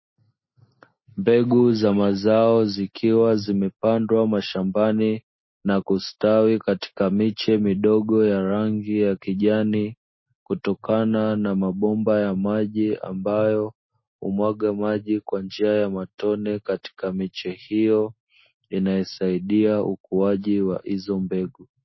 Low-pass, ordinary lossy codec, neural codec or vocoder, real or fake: 7.2 kHz; MP3, 24 kbps; none; real